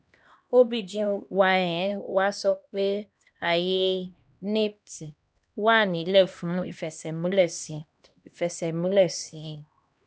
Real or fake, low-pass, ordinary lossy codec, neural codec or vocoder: fake; none; none; codec, 16 kHz, 1 kbps, X-Codec, HuBERT features, trained on LibriSpeech